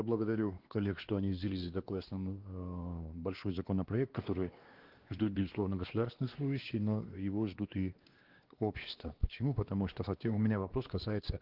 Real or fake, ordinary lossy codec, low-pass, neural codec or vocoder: fake; Opus, 16 kbps; 5.4 kHz; codec, 16 kHz, 2 kbps, X-Codec, WavLM features, trained on Multilingual LibriSpeech